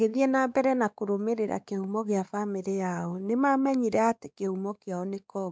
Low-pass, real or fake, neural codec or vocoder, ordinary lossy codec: none; fake; codec, 16 kHz, 4 kbps, X-Codec, WavLM features, trained on Multilingual LibriSpeech; none